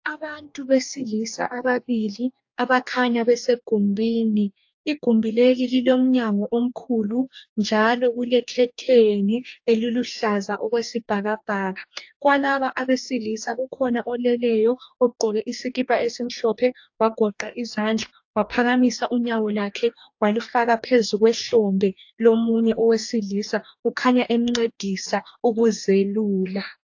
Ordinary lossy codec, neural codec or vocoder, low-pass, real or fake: AAC, 48 kbps; codec, 44.1 kHz, 2.6 kbps, DAC; 7.2 kHz; fake